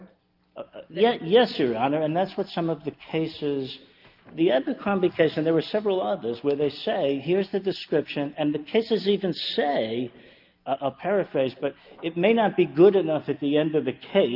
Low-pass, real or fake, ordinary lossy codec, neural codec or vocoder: 5.4 kHz; real; Opus, 32 kbps; none